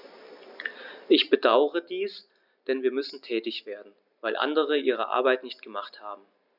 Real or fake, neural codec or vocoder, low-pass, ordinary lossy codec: real; none; 5.4 kHz; none